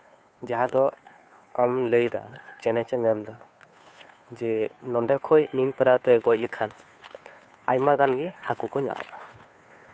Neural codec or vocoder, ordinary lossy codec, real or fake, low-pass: codec, 16 kHz, 2 kbps, FunCodec, trained on Chinese and English, 25 frames a second; none; fake; none